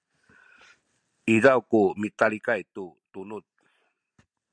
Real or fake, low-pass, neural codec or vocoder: real; 9.9 kHz; none